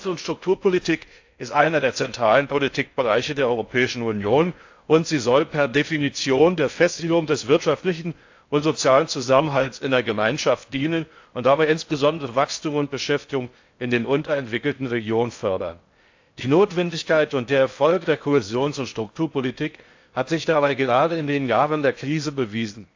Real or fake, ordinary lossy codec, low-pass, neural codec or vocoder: fake; AAC, 48 kbps; 7.2 kHz; codec, 16 kHz in and 24 kHz out, 0.6 kbps, FocalCodec, streaming, 4096 codes